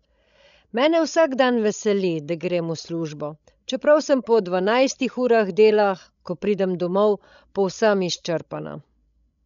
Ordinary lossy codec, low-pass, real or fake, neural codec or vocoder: none; 7.2 kHz; fake; codec, 16 kHz, 16 kbps, FreqCodec, larger model